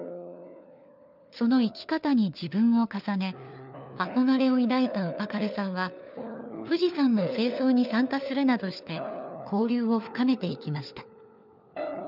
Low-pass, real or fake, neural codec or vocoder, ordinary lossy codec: 5.4 kHz; fake; codec, 16 kHz, 4 kbps, FunCodec, trained on LibriTTS, 50 frames a second; none